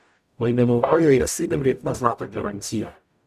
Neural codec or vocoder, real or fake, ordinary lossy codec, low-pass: codec, 44.1 kHz, 0.9 kbps, DAC; fake; none; 14.4 kHz